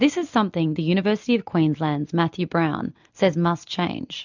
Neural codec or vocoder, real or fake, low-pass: none; real; 7.2 kHz